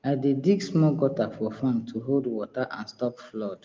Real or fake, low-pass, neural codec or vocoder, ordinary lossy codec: real; 7.2 kHz; none; Opus, 32 kbps